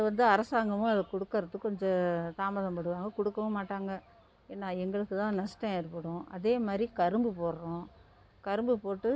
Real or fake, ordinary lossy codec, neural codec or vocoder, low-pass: real; none; none; none